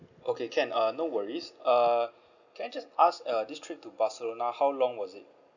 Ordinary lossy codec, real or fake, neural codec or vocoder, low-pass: none; real; none; 7.2 kHz